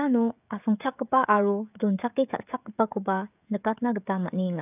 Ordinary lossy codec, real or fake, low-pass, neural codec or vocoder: none; fake; 3.6 kHz; codec, 16 kHz, 16 kbps, FreqCodec, smaller model